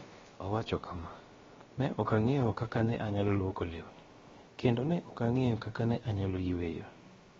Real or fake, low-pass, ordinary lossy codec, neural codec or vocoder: fake; 7.2 kHz; AAC, 24 kbps; codec, 16 kHz, about 1 kbps, DyCAST, with the encoder's durations